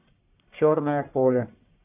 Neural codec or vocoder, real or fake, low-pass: codec, 44.1 kHz, 1.7 kbps, Pupu-Codec; fake; 3.6 kHz